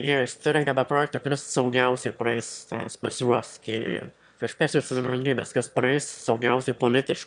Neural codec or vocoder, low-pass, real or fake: autoencoder, 22.05 kHz, a latent of 192 numbers a frame, VITS, trained on one speaker; 9.9 kHz; fake